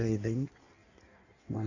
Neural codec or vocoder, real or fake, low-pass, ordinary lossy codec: codec, 16 kHz in and 24 kHz out, 1.1 kbps, FireRedTTS-2 codec; fake; 7.2 kHz; none